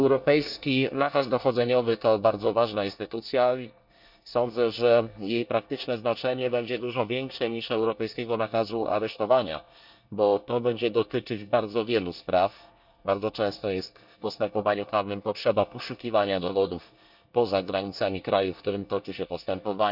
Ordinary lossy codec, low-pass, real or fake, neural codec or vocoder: none; 5.4 kHz; fake; codec, 24 kHz, 1 kbps, SNAC